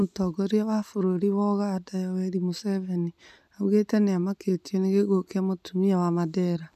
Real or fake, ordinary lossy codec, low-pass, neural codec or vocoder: real; none; 14.4 kHz; none